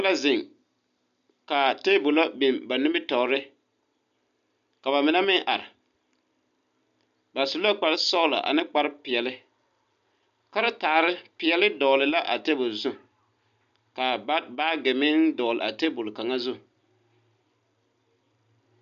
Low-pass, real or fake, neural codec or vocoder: 7.2 kHz; real; none